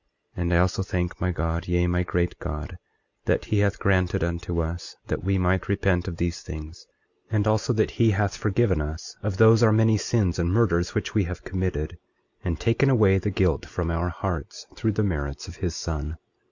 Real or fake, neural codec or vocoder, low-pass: real; none; 7.2 kHz